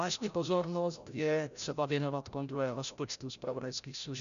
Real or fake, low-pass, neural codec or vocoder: fake; 7.2 kHz; codec, 16 kHz, 0.5 kbps, FreqCodec, larger model